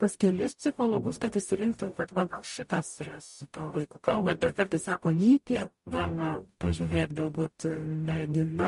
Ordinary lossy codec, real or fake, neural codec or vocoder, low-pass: MP3, 48 kbps; fake; codec, 44.1 kHz, 0.9 kbps, DAC; 14.4 kHz